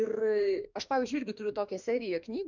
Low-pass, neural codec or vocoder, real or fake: 7.2 kHz; autoencoder, 48 kHz, 32 numbers a frame, DAC-VAE, trained on Japanese speech; fake